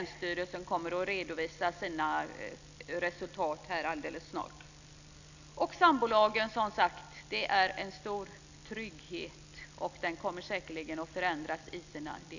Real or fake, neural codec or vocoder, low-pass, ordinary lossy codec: real; none; 7.2 kHz; none